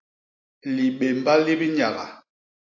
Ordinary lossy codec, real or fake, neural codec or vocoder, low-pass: AAC, 48 kbps; real; none; 7.2 kHz